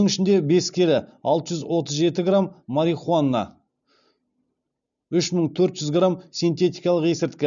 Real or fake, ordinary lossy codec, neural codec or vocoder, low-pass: real; none; none; 7.2 kHz